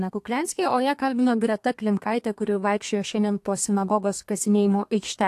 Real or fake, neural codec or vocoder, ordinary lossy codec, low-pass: fake; codec, 32 kHz, 1.9 kbps, SNAC; AAC, 64 kbps; 14.4 kHz